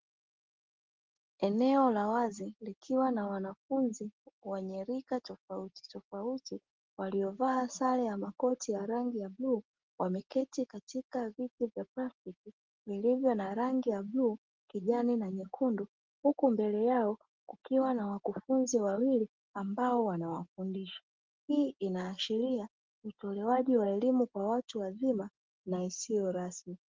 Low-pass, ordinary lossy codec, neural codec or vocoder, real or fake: 7.2 kHz; Opus, 16 kbps; none; real